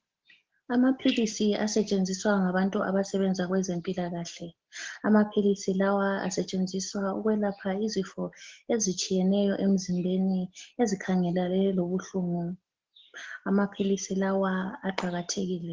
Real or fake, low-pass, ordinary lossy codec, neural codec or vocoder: real; 7.2 kHz; Opus, 16 kbps; none